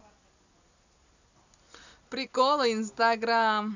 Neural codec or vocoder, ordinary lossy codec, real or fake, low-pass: none; none; real; 7.2 kHz